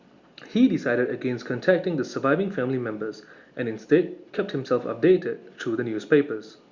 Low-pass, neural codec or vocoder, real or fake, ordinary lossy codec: 7.2 kHz; none; real; Opus, 64 kbps